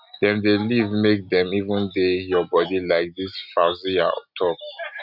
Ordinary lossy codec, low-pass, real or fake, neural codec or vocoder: none; 5.4 kHz; real; none